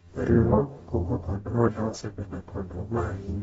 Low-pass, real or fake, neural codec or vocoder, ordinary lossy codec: 19.8 kHz; fake; codec, 44.1 kHz, 0.9 kbps, DAC; AAC, 24 kbps